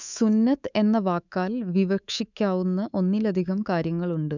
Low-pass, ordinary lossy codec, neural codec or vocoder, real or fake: 7.2 kHz; none; codec, 24 kHz, 3.1 kbps, DualCodec; fake